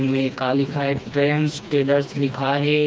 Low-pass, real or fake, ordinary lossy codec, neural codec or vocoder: none; fake; none; codec, 16 kHz, 2 kbps, FreqCodec, smaller model